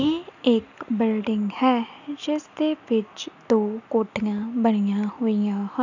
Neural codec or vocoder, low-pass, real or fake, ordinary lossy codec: none; 7.2 kHz; real; none